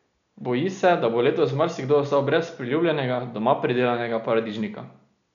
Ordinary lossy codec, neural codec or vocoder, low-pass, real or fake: none; none; 7.2 kHz; real